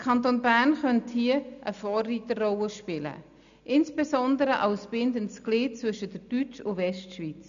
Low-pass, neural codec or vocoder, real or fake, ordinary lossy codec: 7.2 kHz; none; real; none